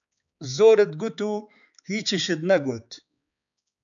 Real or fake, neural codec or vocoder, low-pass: fake; codec, 16 kHz, 4 kbps, X-Codec, HuBERT features, trained on balanced general audio; 7.2 kHz